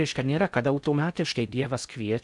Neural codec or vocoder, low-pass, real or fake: codec, 16 kHz in and 24 kHz out, 0.6 kbps, FocalCodec, streaming, 4096 codes; 10.8 kHz; fake